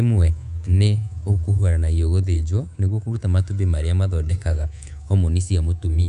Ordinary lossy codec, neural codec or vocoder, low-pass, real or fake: none; codec, 24 kHz, 3.1 kbps, DualCodec; 10.8 kHz; fake